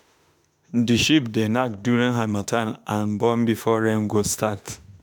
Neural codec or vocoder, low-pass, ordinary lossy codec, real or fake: autoencoder, 48 kHz, 32 numbers a frame, DAC-VAE, trained on Japanese speech; none; none; fake